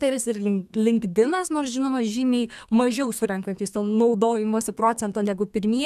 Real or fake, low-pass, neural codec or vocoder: fake; 14.4 kHz; codec, 32 kHz, 1.9 kbps, SNAC